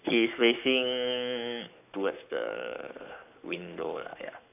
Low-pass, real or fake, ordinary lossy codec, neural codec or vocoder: 3.6 kHz; fake; AAC, 32 kbps; codec, 44.1 kHz, 7.8 kbps, Pupu-Codec